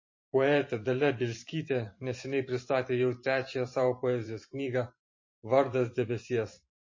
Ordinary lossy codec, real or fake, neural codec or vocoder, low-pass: MP3, 32 kbps; fake; vocoder, 44.1 kHz, 128 mel bands every 512 samples, BigVGAN v2; 7.2 kHz